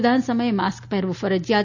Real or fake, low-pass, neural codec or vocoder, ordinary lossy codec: real; 7.2 kHz; none; none